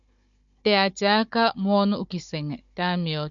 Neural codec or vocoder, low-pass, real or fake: codec, 16 kHz, 16 kbps, FunCodec, trained on Chinese and English, 50 frames a second; 7.2 kHz; fake